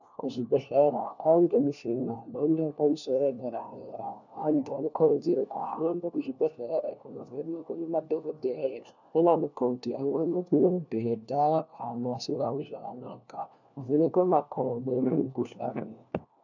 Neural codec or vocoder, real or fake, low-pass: codec, 16 kHz, 1 kbps, FunCodec, trained on LibriTTS, 50 frames a second; fake; 7.2 kHz